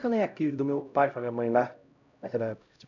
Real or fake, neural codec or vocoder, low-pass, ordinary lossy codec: fake; codec, 16 kHz, 0.5 kbps, X-Codec, HuBERT features, trained on LibriSpeech; 7.2 kHz; none